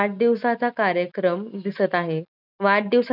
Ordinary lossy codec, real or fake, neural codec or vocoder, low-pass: none; real; none; 5.4 kHz